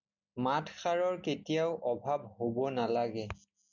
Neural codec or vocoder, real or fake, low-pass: none; real; 7.2 kHz